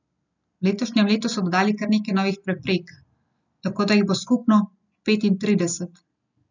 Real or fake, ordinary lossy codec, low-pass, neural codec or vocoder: real; none; 7.2 kHz; none